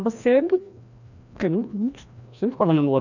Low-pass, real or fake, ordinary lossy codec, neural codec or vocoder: 7.2 kHz; fake; none; codec, 16 kHz, 1 kbps, FreqCodec, larger model